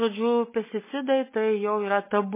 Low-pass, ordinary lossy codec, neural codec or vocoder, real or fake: 3.6 kHz; MP3, 16 kbps; none; real